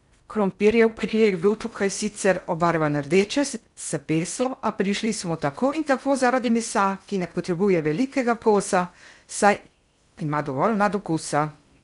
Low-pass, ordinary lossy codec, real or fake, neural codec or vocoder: 10.8 kHz; none; fake; codec, 16 kHz in and 24 kHz out, 0.6 kbps, FocalCodec, streaming, 4096 codes